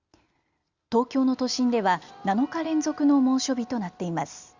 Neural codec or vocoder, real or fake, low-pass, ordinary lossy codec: none; real; 7.2 kHz; Opus, 64 kbps